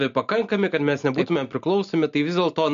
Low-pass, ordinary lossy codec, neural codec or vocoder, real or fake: 7.2 kHz; MP3, 64 kbps; none; real